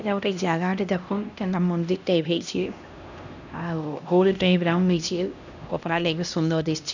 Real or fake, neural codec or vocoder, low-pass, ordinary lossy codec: fake; codec, 16 kHz, 1 kbps, X-Codec, HuBERT features, trained on LibriSpeech; 7.2 kHz; none